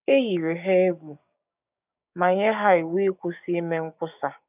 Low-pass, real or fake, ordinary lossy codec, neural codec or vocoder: 3.6 kHz; fake; none; codec, 16 kHz, 6 kbps, DAC